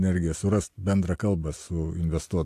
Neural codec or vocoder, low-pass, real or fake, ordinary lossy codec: none; 14.4 kHz; real; AAC, 64 kbps